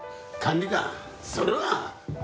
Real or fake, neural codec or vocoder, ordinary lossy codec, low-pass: real; none; none; none